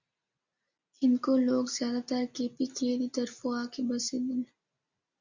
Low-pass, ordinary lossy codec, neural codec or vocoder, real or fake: 7.2 kHz; Opus, 64 kbps; none; real